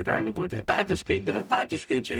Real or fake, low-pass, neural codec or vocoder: fake; 19.8 kHz; codec, 44.1 kHz, 0.9 kbps, DAC